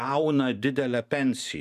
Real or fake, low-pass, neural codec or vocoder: fake; 14.4 kHz; vocoder, 44.1 kHz, 128 mel bands every 512 samples, BigVGAN v2